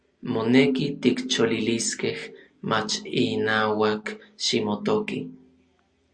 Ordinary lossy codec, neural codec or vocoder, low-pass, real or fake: Opus, 64 kbps; none; 9.9 kHz; real